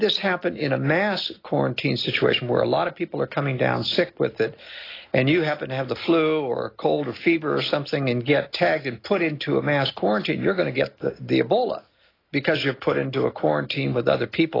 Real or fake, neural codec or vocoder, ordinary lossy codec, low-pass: real; none; AAC, 24 kbps; 5.4 kHz